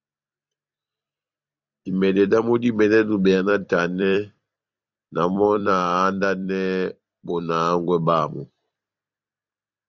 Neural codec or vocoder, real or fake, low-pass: vocoder, 44.1 kHz, 128 mel bands every 256 samples, BigVGAN v2; fake; 7.2 kHz